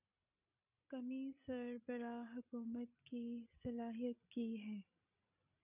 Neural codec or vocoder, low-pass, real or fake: codec, 16 kHz, 16 kbps, FreqCodec, larger model; 3.6 kHz; fake